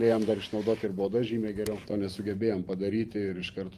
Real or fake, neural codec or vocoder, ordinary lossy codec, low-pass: fake; vocoder, 44.1 kHz, 128 mel bands every 512 samples, BigVGAN v2; Opus, 32 kbps; 14.4 kHz